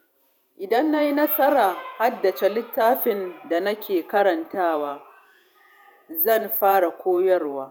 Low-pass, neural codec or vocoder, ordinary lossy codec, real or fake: none; vocoder, 48 kHz, 128 mel bands, Vocos; none; fake